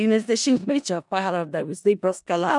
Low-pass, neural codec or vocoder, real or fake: 10.8 kHz; codec, 16 kHz in and 24 kHz out, 0.4 kbps, LongCat-Audio-Codec, four codebook decoder; fake